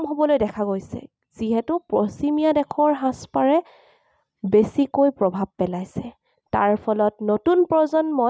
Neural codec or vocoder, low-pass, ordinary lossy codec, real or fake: none; none; none; real